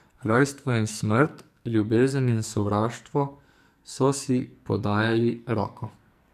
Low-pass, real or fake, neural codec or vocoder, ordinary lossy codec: 14.4 kHz; fake; codec, 44.1 kHz, 2.6 kbps, SNAC; none